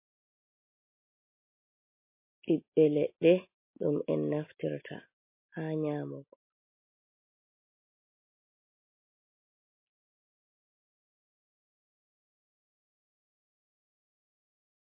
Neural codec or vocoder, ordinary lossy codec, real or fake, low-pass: none; MP3, 24 kbps; real; 3.6 kHz